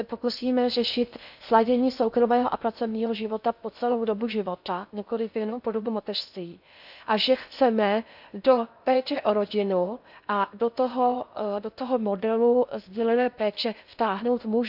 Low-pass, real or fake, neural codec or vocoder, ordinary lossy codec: 5.4 kHz; fake; codec, 16 kHz in and 24 kHz out, 0.6 kbps, FocalCodec, streaming, 2048 codes; none